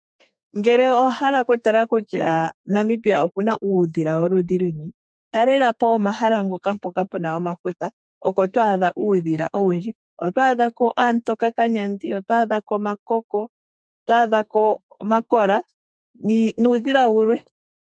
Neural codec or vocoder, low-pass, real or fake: codec, 32 kHz, 1.9 kbps, SNAC; 9.9 kHz; fake